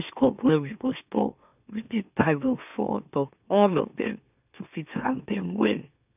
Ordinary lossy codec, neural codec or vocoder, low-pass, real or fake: none; autoencoder, 44.1 kHz, a latent of 192 numbers a frame, MeloTTS; 3.6 kHz; fake